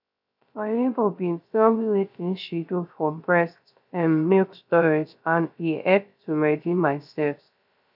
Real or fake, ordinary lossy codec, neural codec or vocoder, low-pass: fake; none; codec, 16 kHz, 0.3 kbps, FocalCodec; 5.4 kHz